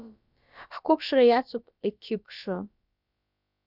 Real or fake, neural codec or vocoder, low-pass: fake; codec, 16 kHz, about 1 kbps, DyCAST, with the encoder's durations; 5.4 kHz